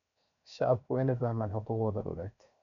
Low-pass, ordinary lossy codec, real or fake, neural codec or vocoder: 7.2 kHz; none; fake; codec, 16 kHz, 0.7 kbps, FocalCodec